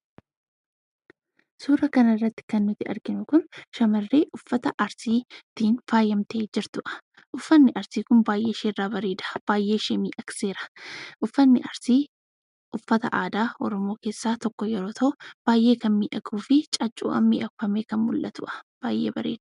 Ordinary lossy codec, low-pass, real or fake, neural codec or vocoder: MP3, 96 kbps; 10.8 kHz; real; none